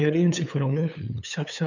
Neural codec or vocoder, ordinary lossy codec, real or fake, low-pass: codec, 16 kHz, 8 kbps, FunCodec, trained on LibriTTS, 25 frames a second; none; fake; 7.2 kHz